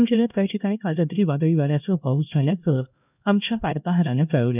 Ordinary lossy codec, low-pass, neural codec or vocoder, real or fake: none; 3.6 kHz; codec, 16 kHz, 1 kbps, X-Codec, HuBERT features, trained on LibriSpeech; fake